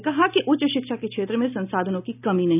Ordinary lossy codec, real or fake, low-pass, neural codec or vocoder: none; real; 3.6 kHz; none